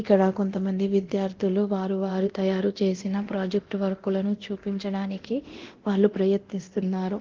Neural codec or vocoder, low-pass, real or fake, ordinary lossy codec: codec, 24 kHz, 0.9 kbps, DualCodec; 7.2 kHz; fake; Opus, 16 kbps